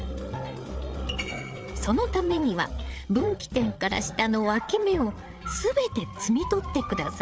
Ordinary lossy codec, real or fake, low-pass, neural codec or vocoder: none; fake; none; codec, 16 kHz, 8 kbps, FreqCodec, larger model